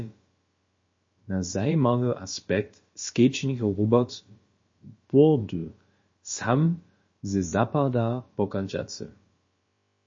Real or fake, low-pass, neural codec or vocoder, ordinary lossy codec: fake; 7.2 kHz; codec, 16 kHz, about 1 kbps, DyCAST, with the encoder's durations; MP3, 32 kbps